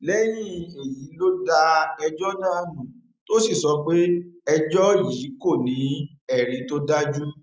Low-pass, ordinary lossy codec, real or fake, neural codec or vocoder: none; none; real; none